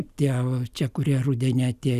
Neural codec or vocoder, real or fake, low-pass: none; real; 14.4 kHz